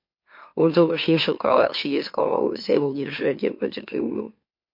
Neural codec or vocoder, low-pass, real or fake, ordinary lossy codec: autoencoder, 44.1 kHz, a latent of 192 numbers a frame, MeloTTS; 5.4 kHz; fake; MP3, 32 kbps